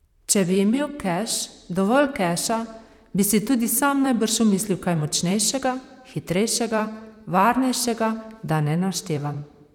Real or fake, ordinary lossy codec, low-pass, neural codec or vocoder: fake; none; 19.8 kHz; vocoder, 44.1 kHz, 128 mel bands, Pupu-Vocoder